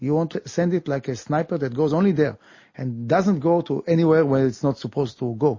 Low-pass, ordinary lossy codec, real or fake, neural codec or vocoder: 7.2 kHz; MP3, 32 kbps; real; none